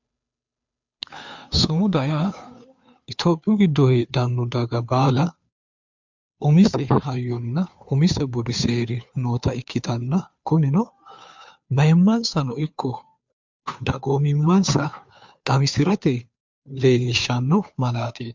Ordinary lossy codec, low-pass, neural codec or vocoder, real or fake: MP3, 64 kbps; 7.2 kHz; codec, 16 kHz, 2 kbps, FunCodec, trained on Chinese and English, 25 frames a second; fake